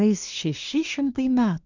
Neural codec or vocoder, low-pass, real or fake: codec, 24 kHz, 1 kbps, SNAC; 7.2 kHz; fake